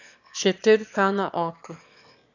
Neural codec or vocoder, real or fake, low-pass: autoencoder, 22.05 kHz, a latent of 192 numbers a frame, VITS, trained on one speaker; fake; 7.2 kHz